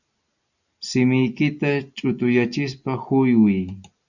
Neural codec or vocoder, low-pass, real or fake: none; 7.2 kHz; real